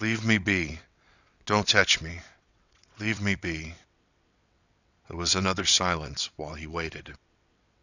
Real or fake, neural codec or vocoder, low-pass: fake; vocoder, 22.05 kHz, 80 mel bands, WaveNeXt; 7.2 kHz